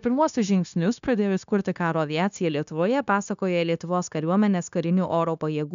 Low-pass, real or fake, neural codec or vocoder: 7.2 kHz; fake; codec, 16 kHz, 0.9 kbps, LongCat-Audio-Codec